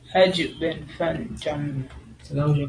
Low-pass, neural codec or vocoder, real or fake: 9.9 kHz; vocoder, 44.1 kHz, 128 mel bands every 512 samples, BigVGAN v2; fake